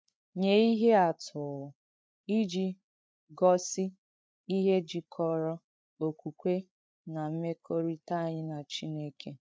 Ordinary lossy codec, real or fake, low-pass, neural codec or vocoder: none; fake; none; codec, 16 kHz, 8 kbps, FreqCodec, larger model